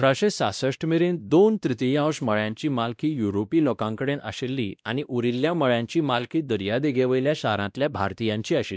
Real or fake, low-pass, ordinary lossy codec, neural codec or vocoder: fake; none; none; codec, 16 kHz, 1 kbps, X-Codec, WavLM features, trained on Multilingual LibriSpeech